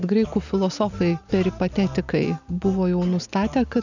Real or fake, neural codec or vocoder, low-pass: real; none; 7.2 kHz